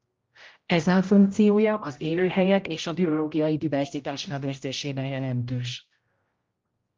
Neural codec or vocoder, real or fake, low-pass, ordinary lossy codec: codec, 16 kHz, 0.5 kbps, X-Codec, HuBERT features, trained on general audio; fake; 7.2 kHz; Opus, 24 kbps